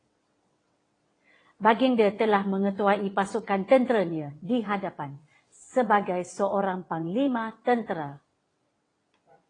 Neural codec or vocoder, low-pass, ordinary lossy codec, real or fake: vocoder, 22.05 kHz, 80 mel bands, WaveNeXt; 9.9 kHz; AAC, 32 kbps; fake